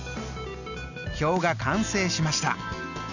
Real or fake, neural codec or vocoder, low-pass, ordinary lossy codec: real; none; 7.2 kHz; none